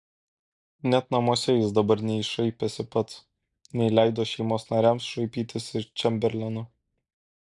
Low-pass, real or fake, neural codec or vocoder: 10.8 kHz; real; none